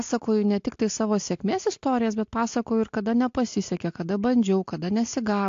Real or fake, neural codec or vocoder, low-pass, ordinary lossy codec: real; none; 7.2 kHz; AAC, 48 kbps